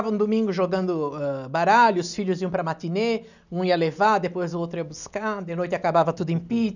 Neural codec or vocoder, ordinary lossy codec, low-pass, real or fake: none; none; 7.2 kHz; real